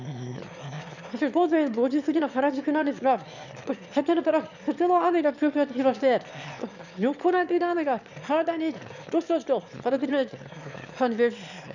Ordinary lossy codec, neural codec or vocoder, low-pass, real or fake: none; autoencoder, 22.05 kHz, a latent of 192 numbers a frame, VITS, trained on one speaker; 7.2 kHz; fake